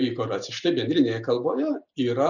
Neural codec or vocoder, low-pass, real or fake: none; 7.2 kHz; real